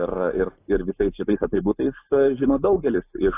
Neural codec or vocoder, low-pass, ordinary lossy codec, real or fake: none; 3.6 kHz; AAC, 24 kbps; real